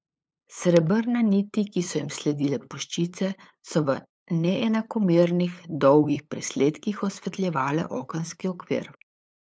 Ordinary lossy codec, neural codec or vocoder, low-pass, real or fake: none; codec, 16 kHz, 8 kbps, FunCodec, trained on LibriTTS, 25 frames a second; none; fake